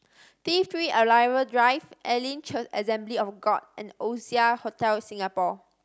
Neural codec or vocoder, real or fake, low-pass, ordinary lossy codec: none; real; none; none